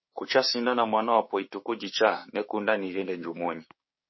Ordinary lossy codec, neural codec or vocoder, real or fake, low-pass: MP3, 24 kbps; codec, 16 kHz, 6 kbps, DAC; fake; 7.2 kHz